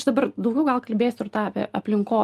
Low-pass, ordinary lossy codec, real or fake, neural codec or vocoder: 14.4 kHz; Opus, 32 kbps; real; none